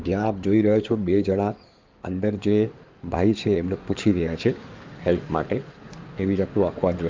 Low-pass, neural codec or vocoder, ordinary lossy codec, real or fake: 7.2 kHz; codec, 16 kHz in and 24 kHz out, 2.2 kbps, FireRedTTS-2 codec; Opus, 24 kbps; fake